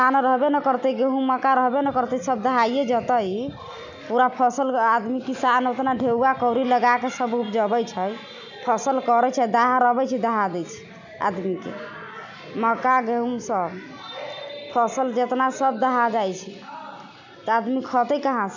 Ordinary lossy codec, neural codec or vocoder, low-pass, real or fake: none; none; 7.2 kHz; real